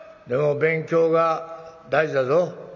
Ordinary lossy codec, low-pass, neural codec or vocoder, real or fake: none; 7.2 kHz; none; real